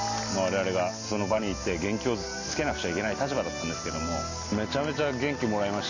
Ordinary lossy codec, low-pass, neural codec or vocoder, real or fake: none; 7.2 kHz; none; real